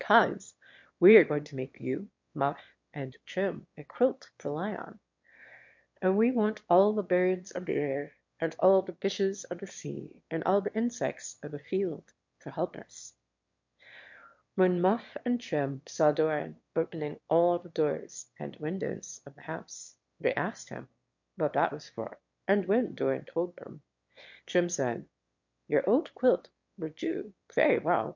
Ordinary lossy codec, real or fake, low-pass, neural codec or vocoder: MP3, 48 kbps; fake; 7.2 kHz; autoencoder, 22.05 kHz, a latent of 192 numbers a frame, VITS, trained on one speaker